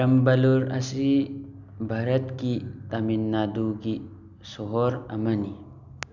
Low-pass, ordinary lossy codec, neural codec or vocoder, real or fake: 7.2 kHz; none; none; real